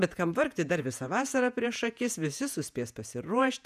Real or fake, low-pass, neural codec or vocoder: fake; 14.4 kHz; vocoder, 48 kHz, 128 mel bands, Vocos